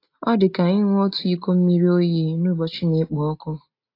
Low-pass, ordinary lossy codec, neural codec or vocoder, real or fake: 5.4 kHz; AAC, 32 kbps; none; real